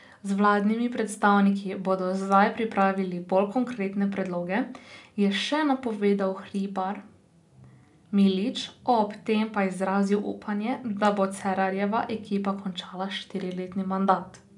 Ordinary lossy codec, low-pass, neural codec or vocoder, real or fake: none; 10.8 kHz; none; real